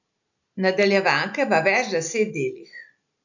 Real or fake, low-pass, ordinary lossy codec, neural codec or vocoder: real; 7.2 kHz; none; none